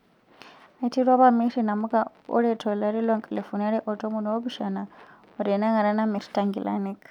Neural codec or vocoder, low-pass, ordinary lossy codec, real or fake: none; 19.8 kHz; none; real